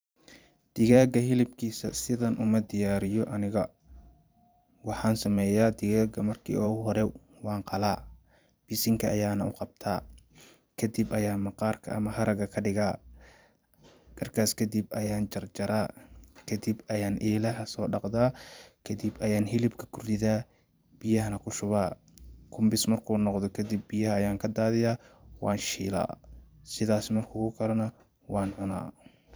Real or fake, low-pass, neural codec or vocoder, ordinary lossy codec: fake; none; vocoder, 44.1 kHz, 128 mel bands every 512 samples, BigVGAN v2; none